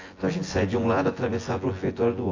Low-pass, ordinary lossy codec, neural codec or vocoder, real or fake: 7.2 kHz; AAC, 32 kbps; vocoder, 24 kHz, 100 mel bands, Vocos; fake